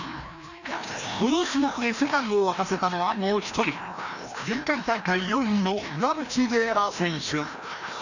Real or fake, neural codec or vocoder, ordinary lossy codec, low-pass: fake; codec, 16 kHz, 1 kbps, FreqCodec, larger model; none; 7.2 kHz